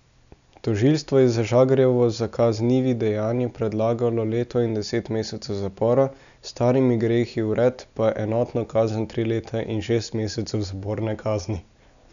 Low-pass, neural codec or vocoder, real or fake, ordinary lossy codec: 7.2 kHz; none; real; none